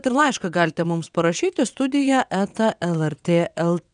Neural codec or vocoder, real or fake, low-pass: vocoder, 22.05 kHz, 80 mel bands, WaveNeXt; fake; 9.9 kHz